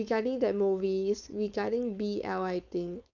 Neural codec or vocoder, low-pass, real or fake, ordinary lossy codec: codec, 16 kHz, 4.8 kbps, FACodec; 7.2 kHz; fake; none